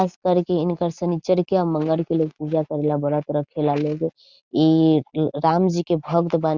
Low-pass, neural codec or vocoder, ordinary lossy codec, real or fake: 7.2 kHz; none; Opus, 64 kbps; real